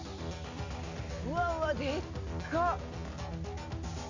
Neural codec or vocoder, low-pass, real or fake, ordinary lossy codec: none; 7.2 kHz; real; Opus, 64 kbps